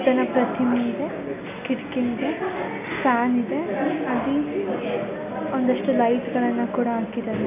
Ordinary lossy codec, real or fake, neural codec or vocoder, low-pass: none; real; none; 3.6 kHz